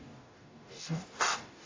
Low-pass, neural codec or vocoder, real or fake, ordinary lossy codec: 7.2 kHz; codec, 44.1 kHz, 0.9 kbps, DAC; fake; AAC, 32 kbps